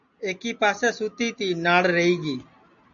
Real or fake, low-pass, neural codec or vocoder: real; 7.2 kHz; none